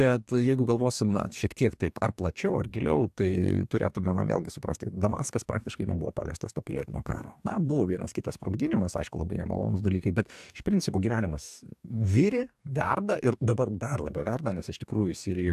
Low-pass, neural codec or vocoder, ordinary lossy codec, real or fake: 14.4 kHz; codec, 44.1 kHz, 2.6 kbps, DAC; AAC, 96 kbps; fake